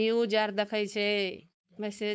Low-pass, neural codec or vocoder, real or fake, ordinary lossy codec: none; codec, 16 kHz, 4.8 kbps, FACodec; fake; none